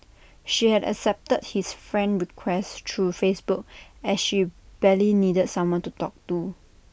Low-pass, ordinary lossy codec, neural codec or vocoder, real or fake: none; none; none; real